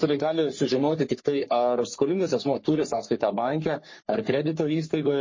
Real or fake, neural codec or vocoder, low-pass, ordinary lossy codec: fake; codec, 44.1 kHz, 3.4 kbps, Pupu-Codec; 7.2 kHz; MP3, 32 kbps